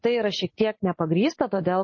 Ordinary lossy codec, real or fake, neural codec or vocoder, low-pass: MP3, 32 kbps; real; none; 7.2 kHz